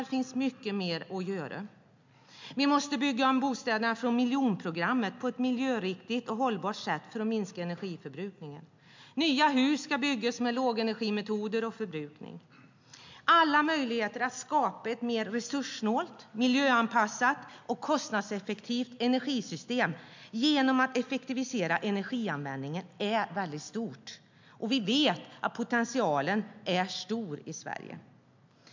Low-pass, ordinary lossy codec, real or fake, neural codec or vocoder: 7.2 kHz; MP3, 64 kbps; real; none